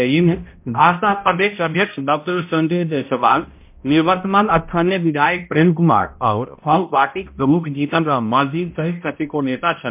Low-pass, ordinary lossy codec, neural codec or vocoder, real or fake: 3.6 kHz; MP3, 32 kbps; codec, 16 kHz, 0.5 kbps, X-Codec, HuBERT features, trained on balanced general audio; fake